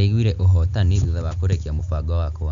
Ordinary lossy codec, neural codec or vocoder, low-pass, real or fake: none; none; 7.2 kHz; real